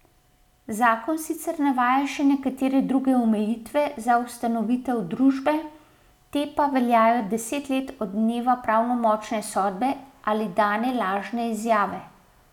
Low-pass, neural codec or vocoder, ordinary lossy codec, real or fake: 19.8 kHz; none; none; real